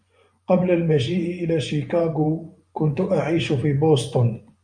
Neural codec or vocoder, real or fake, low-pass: vocoder, 24 kHz, 100 mel bands, Vocos; fake; 9.9 kHz